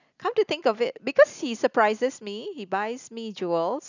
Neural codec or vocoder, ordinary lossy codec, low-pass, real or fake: none; none; 7.2 kHz; real